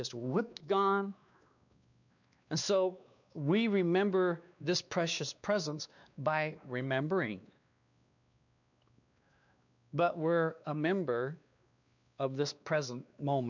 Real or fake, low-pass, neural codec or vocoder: fake; 7.2 kHz; codec, 16 kHz, 2 kbps, X-Codec, WavLM features, trained on Multilingual LibriSpeech